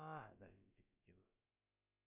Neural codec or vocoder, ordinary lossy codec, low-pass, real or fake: codec, 16 kHz, about 1 kbps, DyCAST, with the encoder's durations; MP3, 32 kbps; 3.6 kHz; fake